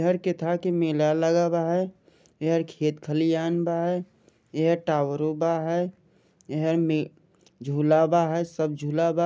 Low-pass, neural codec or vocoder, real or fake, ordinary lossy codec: none; none; real; none